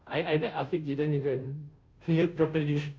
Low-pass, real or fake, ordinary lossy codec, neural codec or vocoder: none; fake; none; codec, 16 kHz, 0.5 kbps, FunCodec, trained on Chinese and English, 25 frames a second